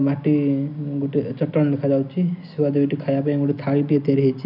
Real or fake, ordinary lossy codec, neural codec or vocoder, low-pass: real; none; none; 5.4 kHz